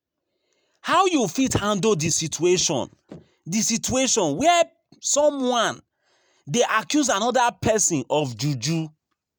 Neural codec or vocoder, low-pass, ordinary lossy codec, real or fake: none; none; none; real